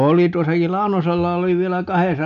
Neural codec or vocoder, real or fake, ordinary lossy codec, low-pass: none; real; none; 7.2 kHz